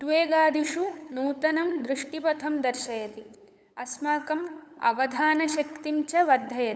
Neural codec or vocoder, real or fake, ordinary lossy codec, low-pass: codec, 16 kHz, 8 kbps, FunCodec, trained on LibriTTS, 25 frames a second; fake; none; none